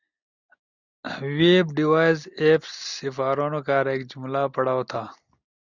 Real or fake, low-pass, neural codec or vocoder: real; 7.2 kHz; none